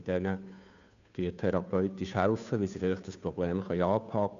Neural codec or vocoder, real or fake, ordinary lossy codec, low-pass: codec, 16 kHz, 2 kbps, FunCodec, trained on Chinese and English, 25 frames a second; fake; AAC, 96 kbps; 7.2 kHz